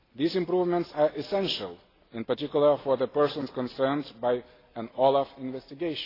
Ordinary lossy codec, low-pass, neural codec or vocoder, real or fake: AAC, 24 kbps; 5.4 kHz; none; real